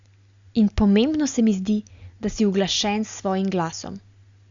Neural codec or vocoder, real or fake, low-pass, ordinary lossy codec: none; real; 7.2 kHz; Opus, 64 kbps